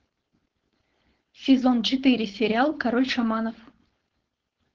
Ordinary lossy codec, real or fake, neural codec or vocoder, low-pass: Opus, 16 kbps; fake; codec, 16 kHz, 4.8 kbps, FACodec; 7.2 kHz